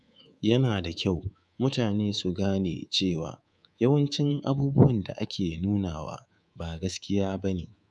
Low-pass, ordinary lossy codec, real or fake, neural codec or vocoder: none; none; fake; codec, 24 kHz, 3.1 kbps, DualCodec